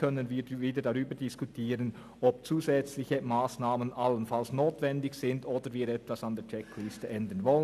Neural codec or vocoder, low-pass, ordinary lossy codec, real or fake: none; 14.4 kHz; none; real